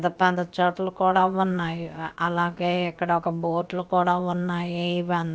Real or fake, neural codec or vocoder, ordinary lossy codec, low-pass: fake; codec, 16 kHz, about 1 kbps, DyCAST, with the encoder's durations; none; none